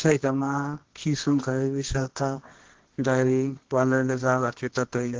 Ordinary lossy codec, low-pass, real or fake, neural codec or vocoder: Opus, 16 kbps; 7.2 kHz; fake; codec, 24 kHz, 0.9 kbps, WavTokenizer, medium music audio release